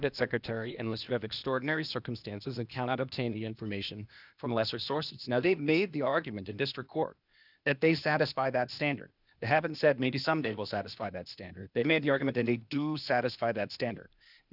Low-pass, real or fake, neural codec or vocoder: 5.4 kHz; fake; codec, 16 kHz, 0.8 kbps, ZipCodec